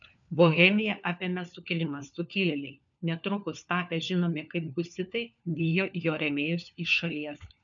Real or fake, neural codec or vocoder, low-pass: fake; codec, 16 kHz, 4 kbps, FunCodec, trained on LibriTTS, 50 frames a second; 7.2 kHz